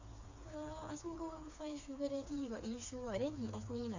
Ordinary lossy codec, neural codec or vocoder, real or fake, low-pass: AAC, 48 kbps; codec, 16 kHz, 4 kbps, FreqCodec, smaller model; fake; 7.2 kHz